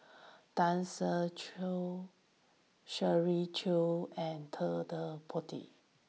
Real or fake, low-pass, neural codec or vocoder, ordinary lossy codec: real; none; none; none